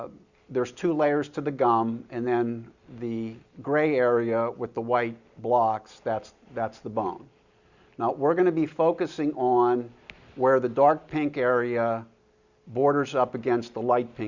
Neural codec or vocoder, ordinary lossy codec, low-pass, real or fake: none; Opus, 64 kbps; 7.2 kHz; real